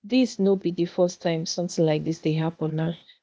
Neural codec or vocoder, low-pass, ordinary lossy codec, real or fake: codec, 16 kHz, 0.8 kbps, ZipCodec; none; none; fake